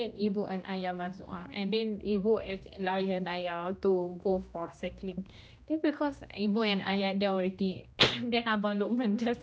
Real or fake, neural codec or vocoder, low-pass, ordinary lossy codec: fake; codec, 16 kHz, 1 kbps, X-Codec, HuBERT features, trained on general audio; none; none